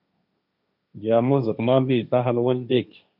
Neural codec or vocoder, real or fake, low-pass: codec, 16 kHz, 1.1 kbps, Voila-Tokenizer; fake; 5.4 kHz